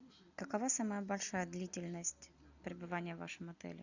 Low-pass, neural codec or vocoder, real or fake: 7.2 kHz; none; real